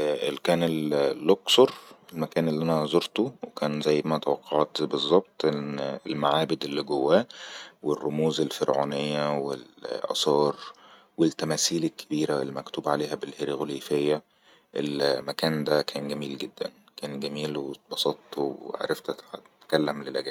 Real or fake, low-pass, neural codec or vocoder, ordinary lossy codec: real; 19.8 kHz; none; none